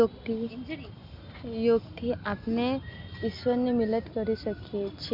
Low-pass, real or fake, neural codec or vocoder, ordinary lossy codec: 5.4 kHz; real; none; MP3, 48 kbps